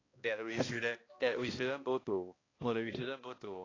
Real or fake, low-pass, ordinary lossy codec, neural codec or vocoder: fake; 7.2 kHz; AAC, 32 kbps; codec, 16 kHz, 1 kbps, X-Codec, HuBERT features, trained on balanced general audio